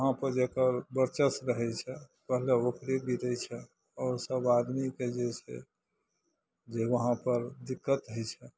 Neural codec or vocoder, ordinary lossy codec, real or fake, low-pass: none; none; real; none